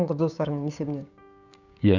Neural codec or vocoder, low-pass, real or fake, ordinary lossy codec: codec, 16 kHz, 6 kbps, DAC; 7.2 kHz; fake; none